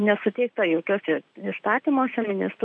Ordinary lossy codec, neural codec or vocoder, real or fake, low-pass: MP3, 96 kbps; none; real; 9.9 kHz